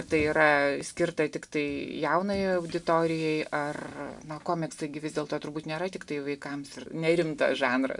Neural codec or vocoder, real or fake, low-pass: none; real; 10.8 kHz